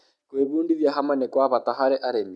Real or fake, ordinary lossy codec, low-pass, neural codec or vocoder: real; none; none; none